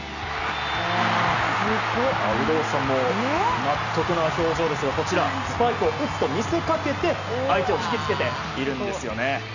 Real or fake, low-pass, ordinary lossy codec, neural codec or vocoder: real; 7.2 kHz; none; none